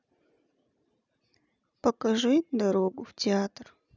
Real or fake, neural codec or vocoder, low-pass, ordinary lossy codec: real; none; 7.2 kHz; none